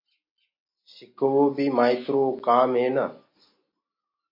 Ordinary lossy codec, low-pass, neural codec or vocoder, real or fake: MP3, 32 kbps; 5.4 kHz; none; real